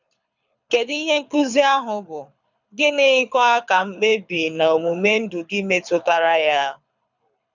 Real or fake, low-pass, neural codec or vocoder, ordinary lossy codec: fake; 7.2 kHz; codec, 24 kHz, 6 kbps, HILCodec; none